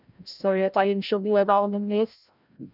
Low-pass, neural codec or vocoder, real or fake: 5.4 kHz; codec, 16 kHz, 0.5 kbps, FreqCodec, larger model; fake